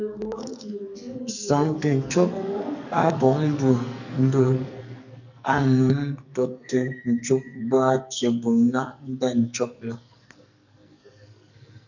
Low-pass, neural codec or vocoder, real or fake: 7.2 kHz; codec, 44.1 kHz, 2.6 kbps, SNAC; fake